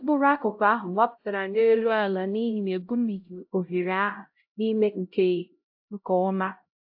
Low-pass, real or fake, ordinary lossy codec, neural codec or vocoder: 5.4 kHz; fake; AAC, 48 kbps; codec, 16 kHz, 0.5 kbps, X-Codec, HuBERT features, trained on LibriSpeech